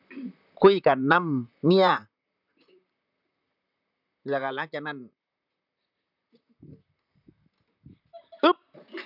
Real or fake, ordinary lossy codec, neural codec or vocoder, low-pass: fake; none; vocoder, 44.1 kHz, 128 mel bands, Pupu-Vocoder; 5.4 kHz